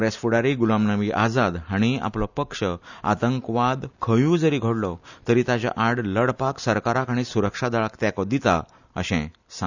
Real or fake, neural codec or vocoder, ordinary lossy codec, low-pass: real; none; none; 7.2 kHz